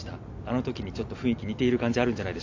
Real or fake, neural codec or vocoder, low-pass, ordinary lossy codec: real; none; 7.2 kHz; AAC, 48 kbps